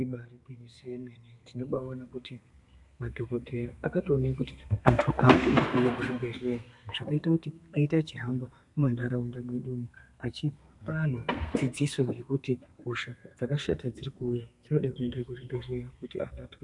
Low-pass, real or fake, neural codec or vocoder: 10.8 kHz; fake; codec, 32 kHz, 1.9 kbps, SNAC